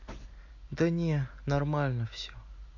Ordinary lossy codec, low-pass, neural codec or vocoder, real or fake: none; 7.2 kHz; none; real